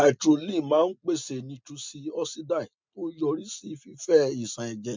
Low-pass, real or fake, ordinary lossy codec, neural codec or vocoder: 7.2 kHz; real; MP3, 64 kbps; none